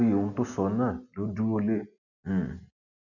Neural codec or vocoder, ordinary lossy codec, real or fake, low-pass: none; none; real; 7.2 kHz